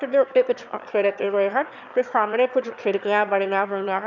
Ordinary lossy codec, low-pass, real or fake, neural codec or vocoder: none; 7.2 kHz; fake; autoencoder, 22.05 kHz, a latent of 192 numbers a frame, VITS, trained on one speaker